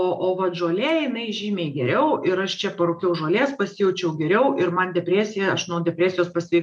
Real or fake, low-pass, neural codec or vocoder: real; 10.8 kHz; none